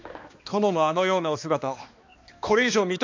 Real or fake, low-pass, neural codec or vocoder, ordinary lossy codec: fake; 7.2 kHz; codec, 16 kHz, 2 kbps, X-Codec, HuBERT features, trained on balanced general audio; MP3, 64 kbps